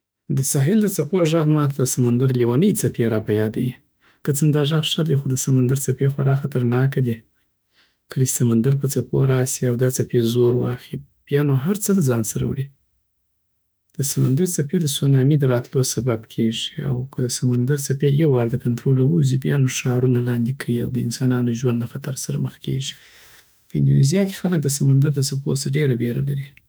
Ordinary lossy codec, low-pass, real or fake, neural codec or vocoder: none; none; fake; autoencoder, 48 kHz, 32 numbers a frame, DAC-VAE, trained on Japanese speech